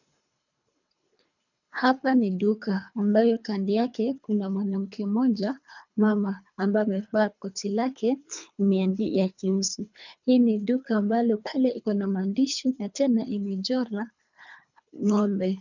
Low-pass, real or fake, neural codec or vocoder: 7.2 kHz; fake; codec, 24 kHz, 3 kbps, HILCodec